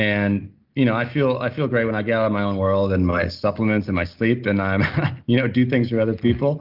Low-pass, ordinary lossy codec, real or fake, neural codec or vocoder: 5.4 kHz; Opus, 32 kbps; real; none